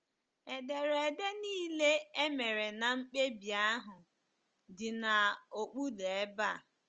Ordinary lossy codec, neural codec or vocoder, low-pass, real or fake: Opus, 24 kbps; none; 7.2 kHz; real